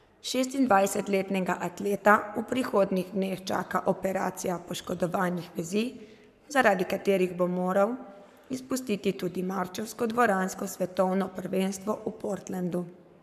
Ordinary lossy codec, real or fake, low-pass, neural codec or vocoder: none; fake; 14.4 kHz; codec, 44.1 kHz, 7.8 kbps, Pupu-Codec